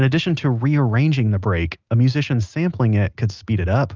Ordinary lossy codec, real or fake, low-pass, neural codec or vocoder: Opus, 32 kbps; real; 7.2 kHz; none